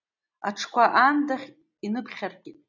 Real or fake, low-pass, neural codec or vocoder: real; 7.2 kHz; none